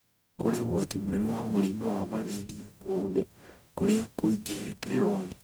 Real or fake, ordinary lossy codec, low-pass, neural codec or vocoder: fake; none; none; codec, 44.1 kHz, 0.9 kbps, DAC